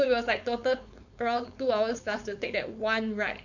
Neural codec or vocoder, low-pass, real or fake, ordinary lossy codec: codec, 16 kHz, 4.8 kbps, FACodec; 7.2 kHz; fake; none